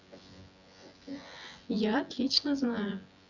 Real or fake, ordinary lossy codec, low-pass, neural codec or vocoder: fake; none; 7.2 kHz; vocoder, 24 kHz, 100 mel bands, Vocos